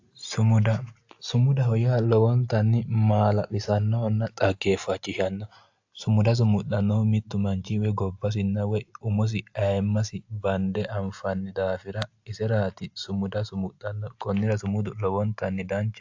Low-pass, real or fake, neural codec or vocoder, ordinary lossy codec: 7.2 kHz; real; none; AAC, 48 kbps